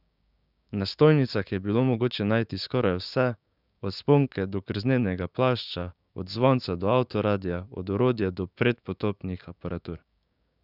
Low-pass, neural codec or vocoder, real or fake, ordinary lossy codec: 5.4 kHz; codec, 16 kHz, 6 kbps, DAC; fake; none